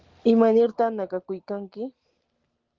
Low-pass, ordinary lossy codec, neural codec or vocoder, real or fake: 7.2 kHz; Opus, 16 kbps; none; real